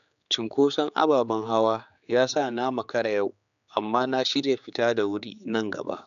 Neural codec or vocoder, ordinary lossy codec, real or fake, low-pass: codec, 16 kHz, 4 kbps, X-Codec, HuBERT features, trained on general audio; none; fake; 7.2 kHz